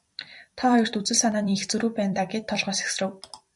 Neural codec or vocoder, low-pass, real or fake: vocoder, 44.1 kHz, 128 mel bands every 256 samples, BigVGAN v2; 10.8 kHz; fake